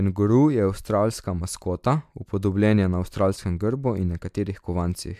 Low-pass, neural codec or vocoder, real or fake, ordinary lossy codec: 14.4 kHz; none; real; MP3, 96 kbps